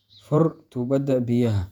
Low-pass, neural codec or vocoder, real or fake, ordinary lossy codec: 19.8 kHz; vocoder, 48 kHz, 128 mel bands, Vocos; fake; none